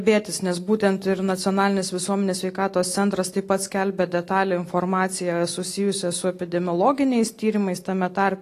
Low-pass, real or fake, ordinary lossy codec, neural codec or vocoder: 14.4 kHz; real; AAC, 48 kbps; none